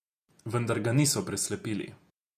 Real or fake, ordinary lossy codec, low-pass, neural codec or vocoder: fake; none; 14.4 kHz; vocoder, 48 kHz, 128 mel bands, Vocos